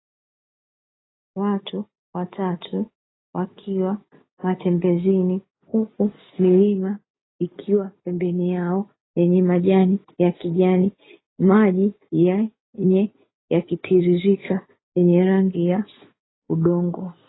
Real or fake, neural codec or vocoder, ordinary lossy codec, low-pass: real; none; AAC, 16 kbps; 7.2 kHz